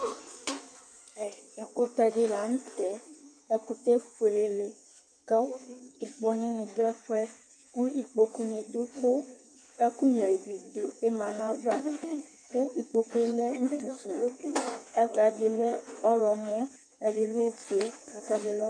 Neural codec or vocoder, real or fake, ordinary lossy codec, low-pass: codec, 16 kHz in and 24 kHz out, 1.1 kbps, FireRedTTS-2 codec; fake; MP3, 96 kbps; 9.9 kHz